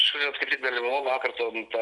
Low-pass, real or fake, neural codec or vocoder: 10.8 kHz; real; none